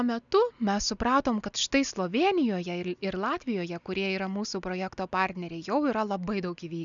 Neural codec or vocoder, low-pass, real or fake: none; 7.2 kHz; real